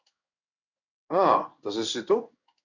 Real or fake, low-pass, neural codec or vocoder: fake; 7.2 kHz; codec, 16 kHz in and 24 kHz out, 1 kbps, XY-Tokenizer